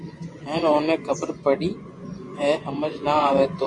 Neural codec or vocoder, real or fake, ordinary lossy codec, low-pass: vocoder, 24 kHz, 100 mel bands, Vocos; fake; MP3, 48 kbps; 10.8 kHz